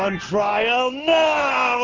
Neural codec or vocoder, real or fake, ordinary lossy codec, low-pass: codec, 16 kHz in and 24 kHz out, 1 kbps, XY-Tokenizer; fake; Opus, 24 kbps; 7.2 kHz